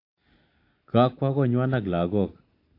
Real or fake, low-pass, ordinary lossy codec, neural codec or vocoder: real; 5.4 kHz; AAC, 32 kbps; none